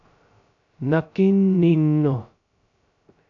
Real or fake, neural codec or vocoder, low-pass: fake; codec, 16 kHz, 0.2 kbps, FocalCodec; 7.2 kHz